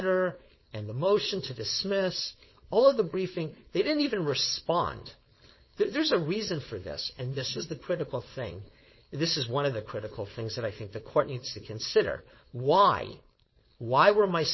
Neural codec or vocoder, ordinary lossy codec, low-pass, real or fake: codec, 16 kHz, 4.8 kbps, FACodec; MP3, 24 kbps; 7.2 kHz; fake